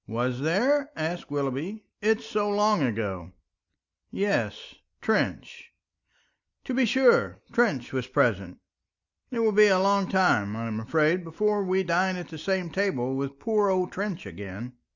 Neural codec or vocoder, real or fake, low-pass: none; real; 7.2 kHz